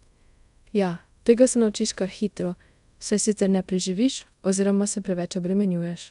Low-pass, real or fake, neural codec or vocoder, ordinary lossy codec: 10.8 kHz; fake; codec, 24 kHz, 0.5 kbps, DualCodec; none